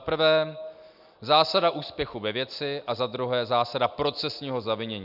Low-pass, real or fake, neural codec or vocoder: 5.4 kHz; real; none